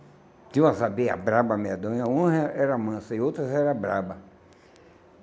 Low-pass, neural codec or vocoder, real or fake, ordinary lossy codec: none; none; real; none